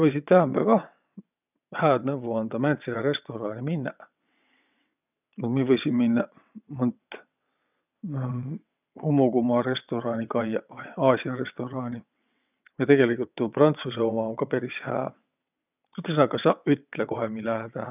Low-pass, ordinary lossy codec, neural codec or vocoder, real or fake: 3.6 kHz; none; vocoder, 22.05 kHz, 80 mel bands, WaveNeXt; fake